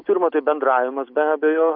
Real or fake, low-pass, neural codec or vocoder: real; 5.4 kHz; none